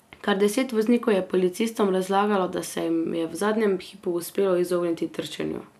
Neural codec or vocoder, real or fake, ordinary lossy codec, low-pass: none; real; none; 14.4 kHz